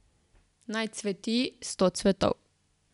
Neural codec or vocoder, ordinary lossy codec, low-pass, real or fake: none; none; 10.8 kHz; real